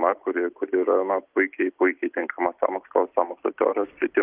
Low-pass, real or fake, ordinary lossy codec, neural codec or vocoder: 3.6 kHz; real; Opus, 32 kbps; none